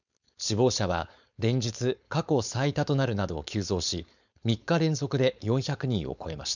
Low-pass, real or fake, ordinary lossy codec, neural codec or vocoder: 7.2 kHz; fake; none; codec, 16 kHz, 4.8 kbps, FACodec